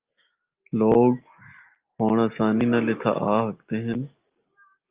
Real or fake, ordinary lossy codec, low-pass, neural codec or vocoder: real; Opus, 24 kbps; 3.6 kHz; none